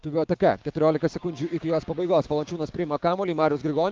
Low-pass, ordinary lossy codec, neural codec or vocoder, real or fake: 7.2 kHz; Opus, 24 kbps; codec, 16 kHz, 6 kbps, DAC; fake